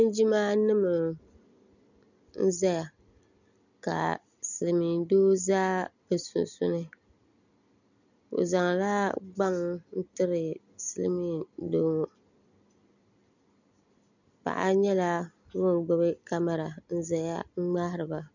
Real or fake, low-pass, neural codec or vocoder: real; 7.2 kHz; none